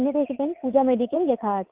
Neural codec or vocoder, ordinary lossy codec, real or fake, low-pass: vocoder, 22.05 kHz, 80 mel bands, Vocos; Opus, 32 kbps; fake; 3.6 kHz